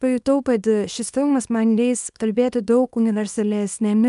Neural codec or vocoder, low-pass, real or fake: codec, 24 kHz, 0.9 kbps, WavTokenizer, small release; 10.8 kHz; fake